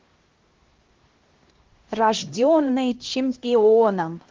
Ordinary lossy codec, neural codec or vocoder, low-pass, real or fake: Opus, 16 kbps; codec, 16 kHz, 1 kbps, X-Codec, HuBERT features, trained on LibriSpeech; 7.2 kHz; fake